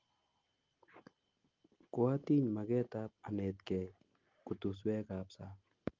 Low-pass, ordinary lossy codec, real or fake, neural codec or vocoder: 7.2 kHz; Opus, 24 kbps; real; none